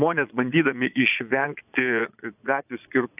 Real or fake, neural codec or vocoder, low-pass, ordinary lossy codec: fake; vocoder, 22.05 kHz, 80 mel bands, Vocos; 3.6 kHz; AAC, 32 kbps